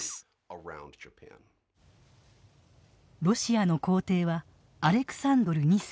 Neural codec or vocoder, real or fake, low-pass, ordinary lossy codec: none; real; none; none